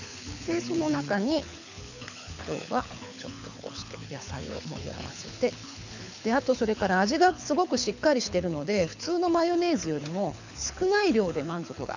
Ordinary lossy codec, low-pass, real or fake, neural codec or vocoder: none; 7.2 kHz; fake; codec, 24 kHz, 6 kbps, HILCodec